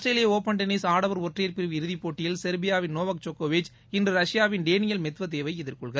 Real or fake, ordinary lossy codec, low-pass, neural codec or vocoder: real; none; none; none